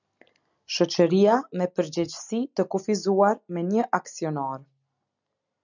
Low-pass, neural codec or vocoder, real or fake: 7.2 kHz; none; real